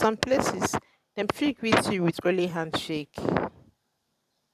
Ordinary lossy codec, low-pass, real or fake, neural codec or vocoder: none; 14.4 kHz; fake; vocoder, 44.1 kHz, 128 mel bands every 512 samples, BigVGAN v2